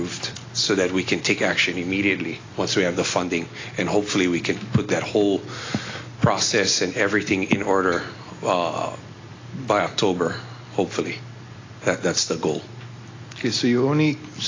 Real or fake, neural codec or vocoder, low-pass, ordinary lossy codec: real; none; 7.2 kHz; AAC, 32 kbps